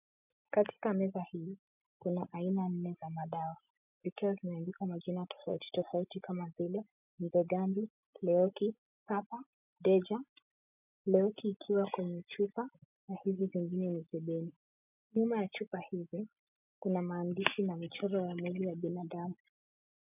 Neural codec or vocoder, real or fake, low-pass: none; real; 3.6 kHz